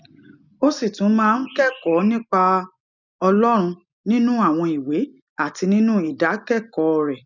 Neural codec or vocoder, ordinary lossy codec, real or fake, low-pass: none; none; real; 7.2 kHz